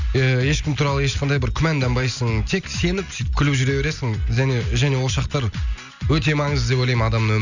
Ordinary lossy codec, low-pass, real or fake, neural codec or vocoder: none; 7.2 kHz; real; none